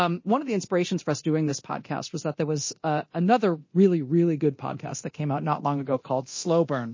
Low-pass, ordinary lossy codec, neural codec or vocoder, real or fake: 7.2 kHz; MP3, 32 kbps; codec, 24 kHz, 0.9 kbps, DualCodec; fake